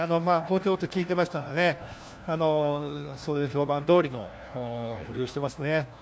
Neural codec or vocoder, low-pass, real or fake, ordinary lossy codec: codec, 16 kHz, 1 kbps, FunCodec, trained on LibriTTS, 50 frames a second; none; fake; none